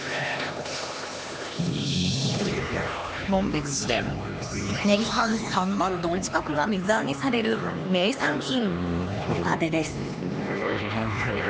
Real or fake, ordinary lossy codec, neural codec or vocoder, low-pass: fake; none; codec, 16 kHz, 2 kbps, X-Codec, HuBERT features, trained on LibriSpeech; none